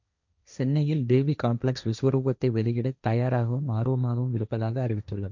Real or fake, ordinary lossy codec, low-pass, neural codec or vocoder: fake; none; 7.2 kHz; codec, 16 kHz, 1.1 kbps, Voila-Tokenizer